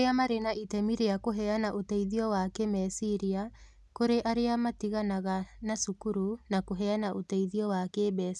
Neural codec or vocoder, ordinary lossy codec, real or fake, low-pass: none; none; real; none